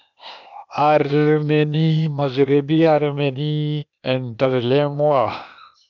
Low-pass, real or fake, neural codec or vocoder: 7.2 kHz; fake; codec, 16 kHz, 0.8 kbps, ZipCodec